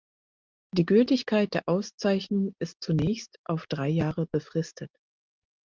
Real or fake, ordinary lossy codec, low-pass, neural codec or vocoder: real; Opus, 32 kbps; 7.2 kHz; none